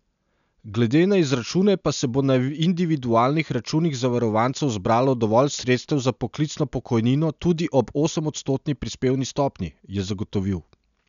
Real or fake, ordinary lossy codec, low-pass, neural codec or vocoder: real; none; 7.2 kHz; none